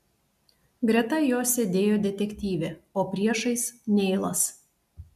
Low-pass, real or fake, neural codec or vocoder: 14.4 kHz; real; none